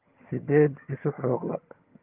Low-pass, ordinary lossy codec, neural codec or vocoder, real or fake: 3.6 kHz; Opus, 32 kbps; vocoder, 22.05 kHz, 80 mel bands, HiFi-GAN; fake